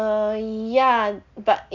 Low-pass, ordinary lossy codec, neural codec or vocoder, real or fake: 7.2 kHz; none; none; real